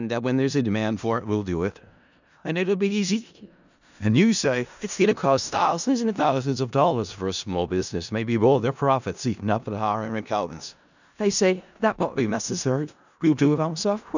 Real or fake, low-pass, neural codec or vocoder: fake; 7.2 kHz; codec, 16 kHz in and 24 kHz out, 0.4 kbps, LongCat-Audio-Codec, four codebook decoder